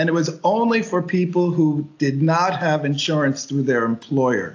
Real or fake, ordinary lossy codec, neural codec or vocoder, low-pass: real; AAC, 48 kbps; none; 7.2 kHz